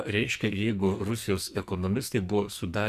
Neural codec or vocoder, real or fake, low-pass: codec, 44.1 kHz, 2.6 kbps, SNAC; fake; 14.4 kHz